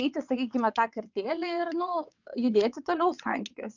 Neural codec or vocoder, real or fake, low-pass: vocoder, 22.05 kHz, 80 mel bands, WaveNeXt; fake; 7.2 kHz